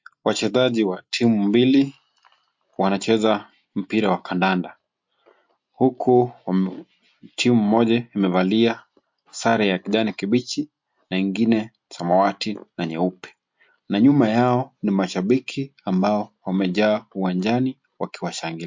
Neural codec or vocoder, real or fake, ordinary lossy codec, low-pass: none; real; MP3, 48 kbps; 7.2 kHz